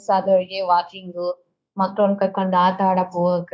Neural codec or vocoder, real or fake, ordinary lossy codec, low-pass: codec, 16 kHz, 0.9 kbps, LongCat-Audio-Codec; fake; none; none